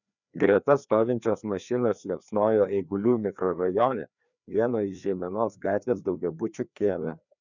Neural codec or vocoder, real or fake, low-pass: codec, 16 kHz, 2 kbps, FreqCodec, larger model; fake; 7.2 kHz